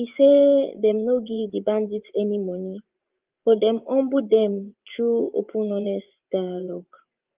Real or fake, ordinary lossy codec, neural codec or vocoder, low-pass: fake; Opus, 32 kbps; vocoder, 24 kHz, 100 mel bands, Vocos; 3.6 kHz